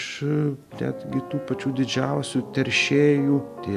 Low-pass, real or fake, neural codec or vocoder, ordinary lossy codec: 14.4 kHz; real; none; AAC, 96 kbps